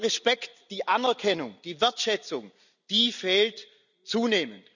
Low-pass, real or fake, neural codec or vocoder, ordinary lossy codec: 7.2 kHz; real; none; none